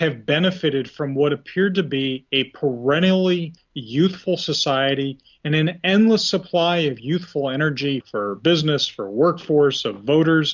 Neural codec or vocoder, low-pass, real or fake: none; 7.2 kHz; real